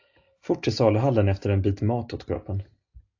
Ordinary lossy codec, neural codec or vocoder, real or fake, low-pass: AAC, 48 kbps; none; real; 7.2 kHz